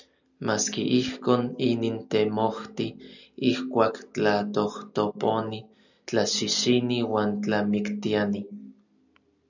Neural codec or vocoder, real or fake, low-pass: none; real; 7.2 kHz